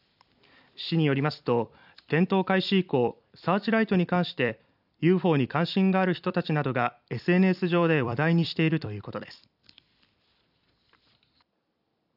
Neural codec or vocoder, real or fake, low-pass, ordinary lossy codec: vocoder, 44.1 kHz, 128 mel bands every 256 samples, BigVGAN v2; fake; 5.4 kHz; none